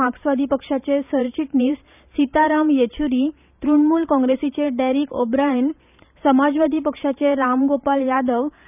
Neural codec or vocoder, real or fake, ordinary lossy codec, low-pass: vocoder, 44.1 kHz, 128 mel bands every 512 samples, BigVGAN v2; fake; none; 3.6 kHz